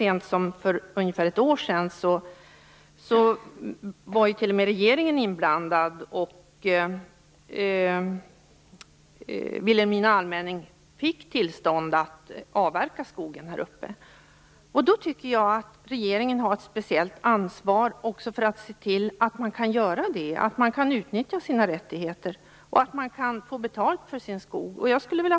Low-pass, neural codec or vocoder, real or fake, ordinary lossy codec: none; none; real; none